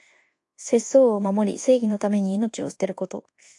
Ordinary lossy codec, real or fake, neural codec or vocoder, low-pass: AAC, 48 kbps; fake; codec, 24 kHz, 1.2 kbps, DualCodec; 10.8 kHz